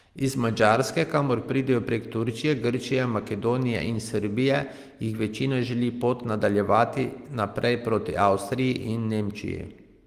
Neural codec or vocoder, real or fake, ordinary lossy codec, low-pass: none; real; Opus, 24 kbps; 14.4 kHz